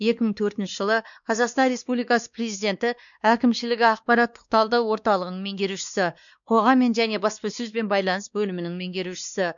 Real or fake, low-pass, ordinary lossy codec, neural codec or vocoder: fake; 7.2 kHz; none; codec, 16 kHz, 2 kbps, X-Codec, WavLM features, trained on Multilingual LibriSpeech